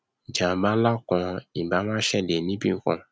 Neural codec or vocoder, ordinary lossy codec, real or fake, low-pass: none; none; real; none